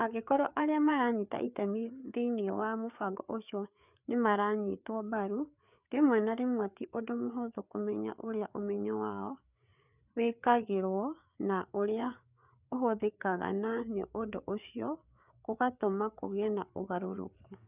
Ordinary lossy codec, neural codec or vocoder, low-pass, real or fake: none; codec, 16 kHz, 4 kbps, FreqCodec, larger model; 3.6 kHz; fake